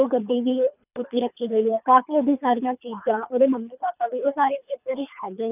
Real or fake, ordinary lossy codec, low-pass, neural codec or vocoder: fake; none; 3.6 kHz; codec, 24 kHz, 3 kbps, HILCodec